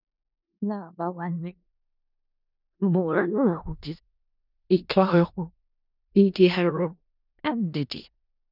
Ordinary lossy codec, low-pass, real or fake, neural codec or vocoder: none; 5.4 kHz; fake; codec, 16 kHz in and 24 kHz out, 0.4 kbps, LongCat-Audio-Codec, four codebook decoder